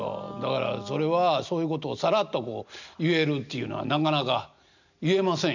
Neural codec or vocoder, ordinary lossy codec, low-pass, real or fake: none; none; 7.2 kHz; real